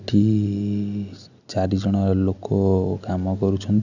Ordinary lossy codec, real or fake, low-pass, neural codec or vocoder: none; real; 7.2 kHz; none